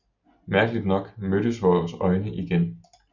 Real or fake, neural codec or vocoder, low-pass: real; none; 7.2 kHz